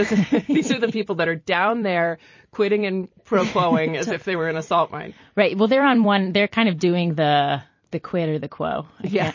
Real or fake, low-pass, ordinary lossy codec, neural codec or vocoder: fake; 7.2 kHz; MP3, 32 kbps; vocoder, 44.1 kHz, 128 mel bands every 256 samples, BigVGAN v2